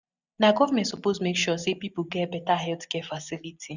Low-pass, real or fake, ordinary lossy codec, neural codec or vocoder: 7.2 kHz; real; none; none